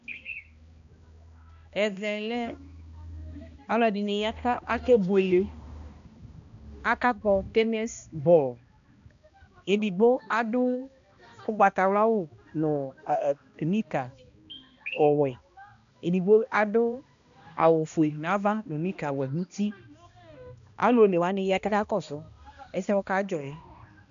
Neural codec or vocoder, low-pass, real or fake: codec, 16 kHz, 1 kbps, X-Codec, HuBERT features, trained on balanced general audio; 7.2 kHz; fake